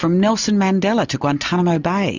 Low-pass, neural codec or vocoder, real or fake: 7.2 kHz; none; real